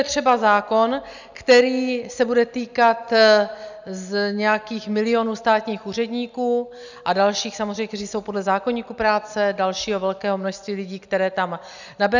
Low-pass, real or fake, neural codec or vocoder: 7.2 kHz; real; none